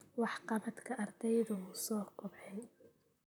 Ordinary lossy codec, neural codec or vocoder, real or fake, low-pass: none; vocoder, 44.1 kHz, 128 mel bands, Pupu-Vocoder; fake; none